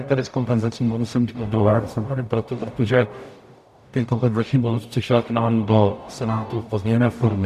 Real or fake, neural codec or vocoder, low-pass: fake; codec, 44.1 kHz, 0.9 kbps, DAC; 14.4 kHz